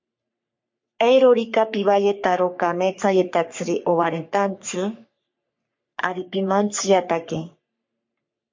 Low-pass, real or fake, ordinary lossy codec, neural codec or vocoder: 7.2 kHz; fake; MP3, 48 kbps; codec, 44.1 kHz, 3.4 kbps, Pupu-Codec